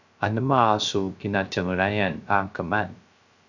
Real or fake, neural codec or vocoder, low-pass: fake; codec, 16 kHz, 0.3 kbps, FocalCodec; 7.2 kHz